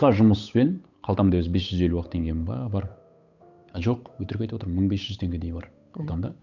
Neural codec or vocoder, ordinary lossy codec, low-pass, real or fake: codec, 16 kHz, 8 kbps, FunCodec, trained on Chinese and English, 25 frames a second; none; 7.2 kHz; fake